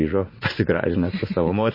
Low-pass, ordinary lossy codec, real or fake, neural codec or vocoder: 5.4 kHz; MP3, 24 kbps; fake; vocoder, 44.1 kHz, 80 mel bands, Vocos